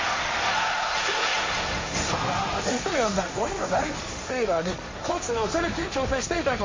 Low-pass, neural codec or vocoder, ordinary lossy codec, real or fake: 7.2 kHz; codec, 16 kHz, 1.1 kbps, Voila-Tokenizer; MP3, 32 kbps; fake